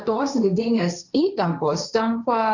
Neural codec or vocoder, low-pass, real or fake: codec, 16 kHz, 1.1 kbps, Voila-Tokenizer; 7.2 kHz; fake